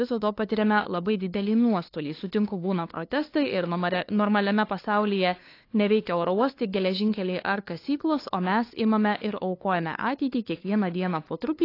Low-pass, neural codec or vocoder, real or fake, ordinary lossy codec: 5.4 kHz; codec, 16 kHz, 2 kbps, FunCodec, trained on LibriTTS, 25 frames a second; fake; AAC, 32 kbps